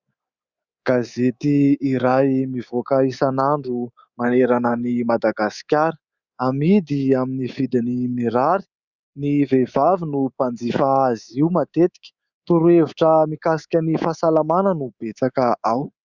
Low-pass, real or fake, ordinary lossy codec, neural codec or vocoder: 7.2 kHz; fake; Opus, 64 kbps; codec, 24 kHz, 3.1 kbps, DualCodec